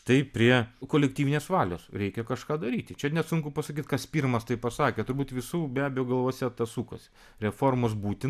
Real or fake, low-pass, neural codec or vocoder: real; 14.4 kHz; none